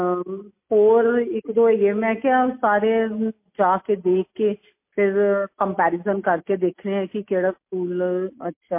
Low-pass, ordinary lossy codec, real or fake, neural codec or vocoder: 3.6 kHz; MP3, 24 kbps; real; none